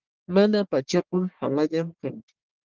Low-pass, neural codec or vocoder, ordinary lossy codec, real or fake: 7.2 kHz; codec, 44.1 kHz, 1.7 kbps, Pupu-Codec; Opus, 16 kbps; fake